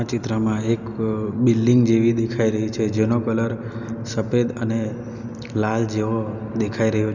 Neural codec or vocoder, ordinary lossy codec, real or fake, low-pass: none; none; real; 7.2 kHz